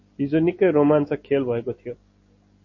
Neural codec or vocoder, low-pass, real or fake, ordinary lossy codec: none; 7.2 kHz; real; MP3, 32 kbps